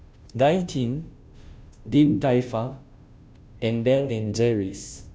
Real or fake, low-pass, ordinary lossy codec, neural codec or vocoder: fake; none; none; codec, 16 kHz, 0.5 kbps, FunCodec, trained on Chinese and English, 25 frames a second